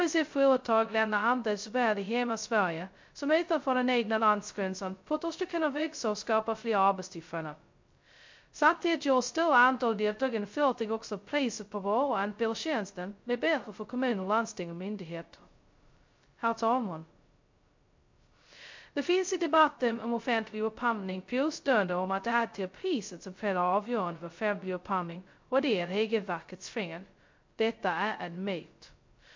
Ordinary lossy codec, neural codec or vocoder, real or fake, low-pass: MP3, 48 kbps; codec, 16 kHz, 0.2 kbps, FocalCodec; fake; 7.2 kHz